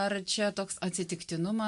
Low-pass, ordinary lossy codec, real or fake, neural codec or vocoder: 10.8 kHz; MP3, 64 kbps; real; none